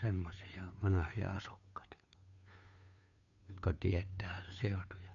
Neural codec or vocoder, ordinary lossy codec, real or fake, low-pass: codec, 16 kHz, 2 kbps, FunCodec, trained on Chinese and English, 25 frames a second; none; fake; 7.2 kHz